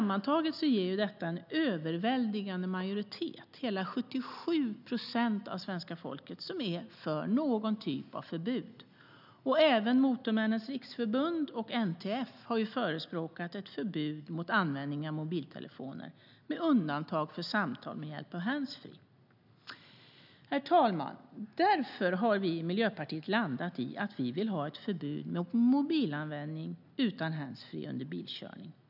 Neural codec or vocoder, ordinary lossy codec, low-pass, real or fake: none; none; 5.4 kHz; real